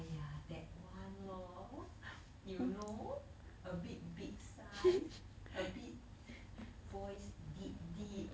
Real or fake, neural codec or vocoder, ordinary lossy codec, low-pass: real; none; none; none